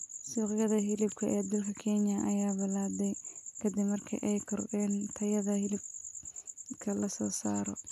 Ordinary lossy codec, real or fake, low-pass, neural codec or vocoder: AAC, 96 kbps; real; 14.4 kHz; none